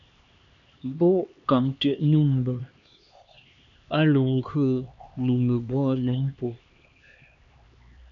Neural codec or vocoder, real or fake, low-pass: codec, 16 kHz, 2 kbps, X-Codec, HuBERT features, trained on LibriSpeech; fake; 7.2 kHz